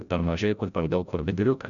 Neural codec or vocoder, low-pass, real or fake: codec, 16 kHz, 0.5 kbps, FreqCodec, larger model; 7.2 kHz; fake